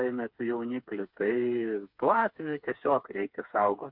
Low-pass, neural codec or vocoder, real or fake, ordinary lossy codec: 5.4 kHz; codec, 16 kHz, 4 kbps, FreqCodec, smaller model; fake; MP3, 48 kbps